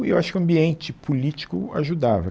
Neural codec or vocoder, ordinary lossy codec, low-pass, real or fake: none; none; none; real